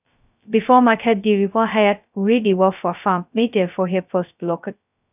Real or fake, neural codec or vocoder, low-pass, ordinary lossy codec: fake; codec, 16 kHz, 0.2 kbps, FocalCodec; 3.6 kHz; none